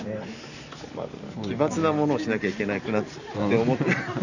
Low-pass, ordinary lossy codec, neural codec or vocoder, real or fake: 7.2 kHz; none; none; real